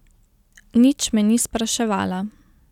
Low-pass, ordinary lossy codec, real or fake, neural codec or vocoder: 19.8 kHz; none; real; none